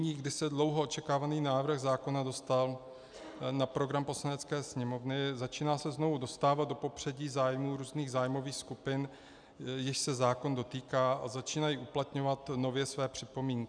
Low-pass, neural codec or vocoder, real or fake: 9.9 kHz; none; real